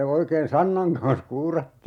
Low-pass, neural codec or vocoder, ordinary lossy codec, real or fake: 19.8 kHz; none; none; real